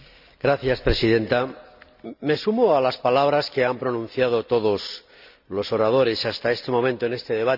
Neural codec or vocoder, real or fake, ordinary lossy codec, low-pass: none; real; none; 5.4 kHz